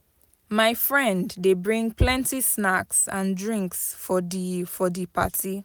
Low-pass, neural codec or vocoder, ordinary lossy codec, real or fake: none; none; none; real